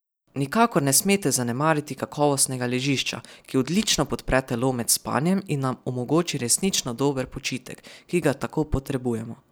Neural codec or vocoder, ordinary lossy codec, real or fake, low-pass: none; none; real; none